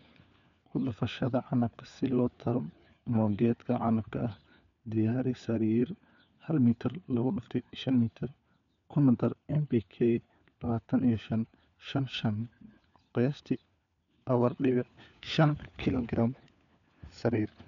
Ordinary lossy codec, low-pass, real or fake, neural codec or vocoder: none; 7.2 kHz; fake; codec, 16 kHz, 4 kbps, FunCodec, trained on LibriTTS, 50 frames a second